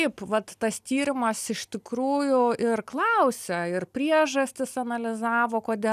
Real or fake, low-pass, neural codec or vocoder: real; 14.4 kHz; none